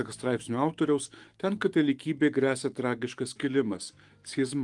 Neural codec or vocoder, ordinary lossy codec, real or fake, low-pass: autoencoder, 48 kHz, 128 numbers a frame, DAC-VAE, trained on Japanese speech; Opus, 24 kbps; fake; 10.8 kHz